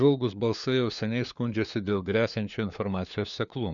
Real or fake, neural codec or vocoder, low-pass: fake; codec, 16 kHz, 4 kbps, FunCodec, trained on LibriTTS, 50 frames a second; 7.2 kHz